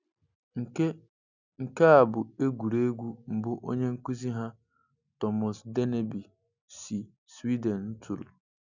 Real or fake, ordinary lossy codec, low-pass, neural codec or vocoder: real; none; 7.2 kHz; none